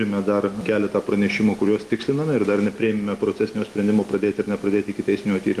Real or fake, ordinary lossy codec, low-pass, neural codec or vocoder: real; Opus, 32 kbps; 14.4 kHz; none